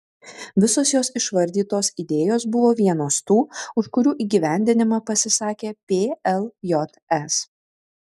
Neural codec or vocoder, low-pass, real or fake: none; 14.4 kHz; real